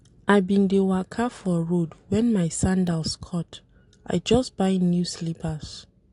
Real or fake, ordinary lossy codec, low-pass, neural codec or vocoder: real; AAC, 48 kbps; 10.8 kHz; none